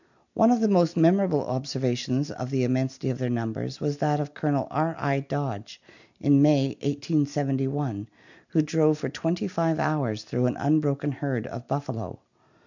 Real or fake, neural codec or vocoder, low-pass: real; none; 7.2 kHz